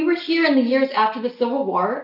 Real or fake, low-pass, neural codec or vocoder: real; 5.4 kHz; none